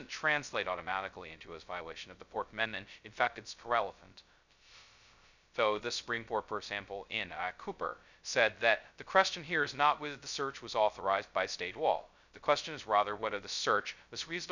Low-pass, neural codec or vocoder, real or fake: 7.2 kHz; codec, 16 kHz, 0.2 kbps, FocalCodec; fake